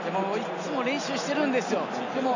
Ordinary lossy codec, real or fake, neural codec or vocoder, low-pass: none; real; none; 7.2 kHz